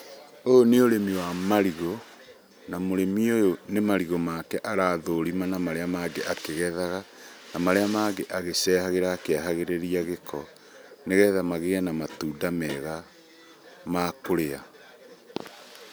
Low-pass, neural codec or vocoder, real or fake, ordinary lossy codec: none; none; real; none